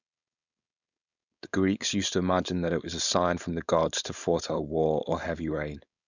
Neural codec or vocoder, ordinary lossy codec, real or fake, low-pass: codec, 16 kHz, 4.8 kbps, FACodec; none; fake; 7.2 kHz